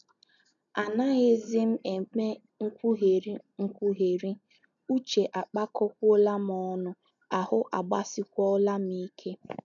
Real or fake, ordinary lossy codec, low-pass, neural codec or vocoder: real; none; 7.2 kHz; none